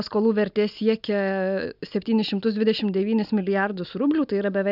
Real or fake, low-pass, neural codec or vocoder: real; 5.4 kHz; none